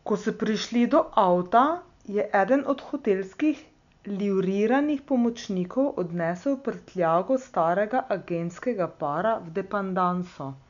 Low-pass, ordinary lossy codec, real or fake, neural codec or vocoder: 7.2 kHz; none; real; none